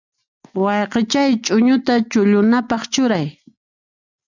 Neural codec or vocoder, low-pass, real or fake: none; 7.2 kHz; real